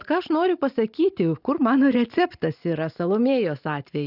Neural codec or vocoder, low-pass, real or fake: none; 5.4 kHz; real